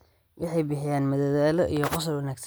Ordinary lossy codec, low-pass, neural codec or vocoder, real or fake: none; none; none; real